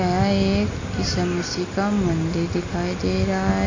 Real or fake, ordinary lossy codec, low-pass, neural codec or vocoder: real; AAC, 32 kbps; 7.2 kHz; none